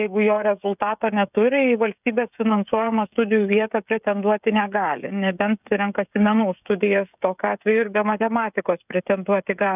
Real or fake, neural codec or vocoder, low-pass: fake; codec, 16 kHz, 16 kbps, FreqCodec, smaller model; 3.6 kHz